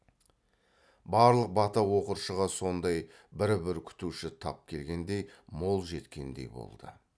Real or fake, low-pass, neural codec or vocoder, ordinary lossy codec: real; none; none; none